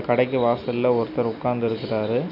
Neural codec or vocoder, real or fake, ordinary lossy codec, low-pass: none; real; none; 5.4 kHz